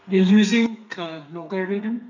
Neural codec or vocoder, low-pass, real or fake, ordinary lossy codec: codec, 32 kHz, 1.9 kbps, SNAC; 7.2 kHz; fake; none